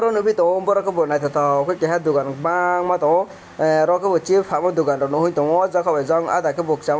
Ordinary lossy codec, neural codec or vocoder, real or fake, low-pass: none; none; real; none